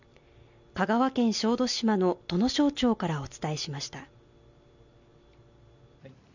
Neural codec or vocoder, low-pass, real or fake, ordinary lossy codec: none; 7.2 kHz; real; none